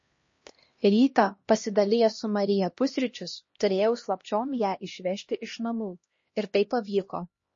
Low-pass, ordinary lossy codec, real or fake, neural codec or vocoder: 7.2 kHz; MP3, 32 kbps; fake; codec, 16 kHz, 1 kbps, X-Codec, HuBERT features, trained on LibriSpeech